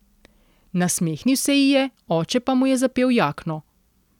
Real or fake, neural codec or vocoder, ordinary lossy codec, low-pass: real; none; none; 19.8 kHz